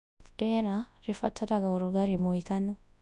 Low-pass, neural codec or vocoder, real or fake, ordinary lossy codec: 10.8 kHz; codec, 24 kHz, 0.9 kbps, WavTokenizer, large speech release; fake; none